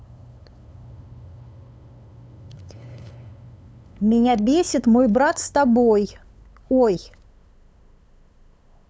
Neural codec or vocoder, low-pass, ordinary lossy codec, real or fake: codec, 16 kHz, 8 kbps, FunCodec, trained on LibriTTS, 25 frames a second; none; none; fake